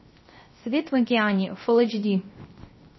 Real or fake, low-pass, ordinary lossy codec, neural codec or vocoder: fake; 7.2 kHz; MP3, 24 kbps; codec, 16 kHz, 0.3 kbps, FocalCodec